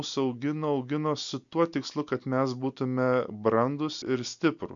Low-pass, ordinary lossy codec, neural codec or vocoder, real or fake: 7.2 kHz; MP3, 64 kbps; none; real